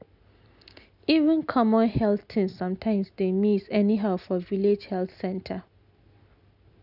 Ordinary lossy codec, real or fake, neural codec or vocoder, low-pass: none; real; none; 5.4 kHz